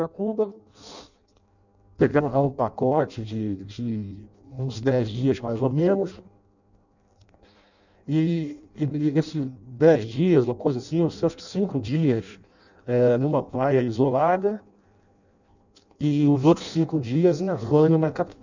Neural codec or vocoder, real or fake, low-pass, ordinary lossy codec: codec, 16 kHz in and 24 kHz out, 0.6 kbps, FireRedTTS-2 codec; fake; 7.2 kHz; none